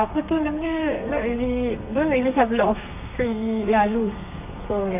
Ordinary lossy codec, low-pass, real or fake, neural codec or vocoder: none; 3.6 kHz; fake; codec, 32 kHz, 1.9 kbps, SNAC